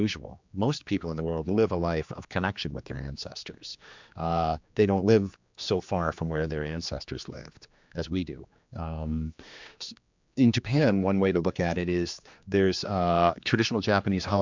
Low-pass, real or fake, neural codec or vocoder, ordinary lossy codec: 7.2 kHz; fake; codec, 16 kHz, 2 kbps, X-Codec, HuBERT features, trained on general audio; MP3, 64 kbps